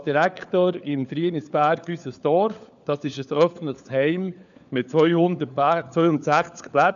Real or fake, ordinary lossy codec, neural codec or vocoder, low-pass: fake; none; codec, 16 kHz, 4 kbps, FunCodec, trained on LibriTTS, 50 frames a second; 7.2 kHz